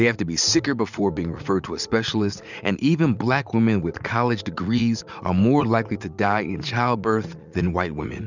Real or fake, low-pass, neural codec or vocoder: fake; 7.2 kHz; vocoder, 44.1 kHz, 80 mel bands, Vocos